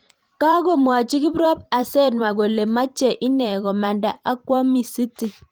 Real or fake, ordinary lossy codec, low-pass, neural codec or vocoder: fake; Opus, 24 kbps; 19.8 kHz; vocoder, 44.1 kHz, 128 mel bands every 512 samples, BigVGAN v2